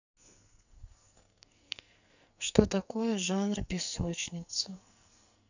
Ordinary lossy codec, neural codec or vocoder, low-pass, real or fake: none; codec, 44.1 kHz, 2.6 kbps, SNAC; 7.2 kHz; fake